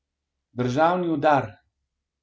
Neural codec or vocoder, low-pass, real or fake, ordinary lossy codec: none; none; real; none